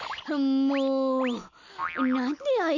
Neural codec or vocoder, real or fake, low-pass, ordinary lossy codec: none; real; 7.2 kHz; none